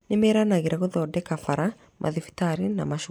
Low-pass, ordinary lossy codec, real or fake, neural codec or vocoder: 19.8 kHz; none; real; none